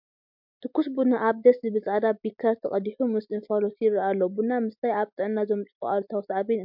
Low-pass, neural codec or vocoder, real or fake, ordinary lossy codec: 5.4 kHz; none; real; MP3, 48 kbps